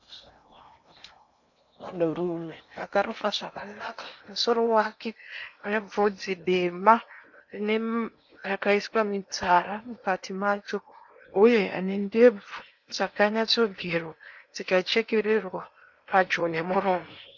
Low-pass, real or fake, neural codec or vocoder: 7.2 kHz; fake; codec, 16 kHz in and 24 kHz out, 0.8 kbps, FocalCodec, streaming, 65536 codes